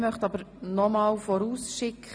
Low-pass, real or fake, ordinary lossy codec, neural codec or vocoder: none; real; none; none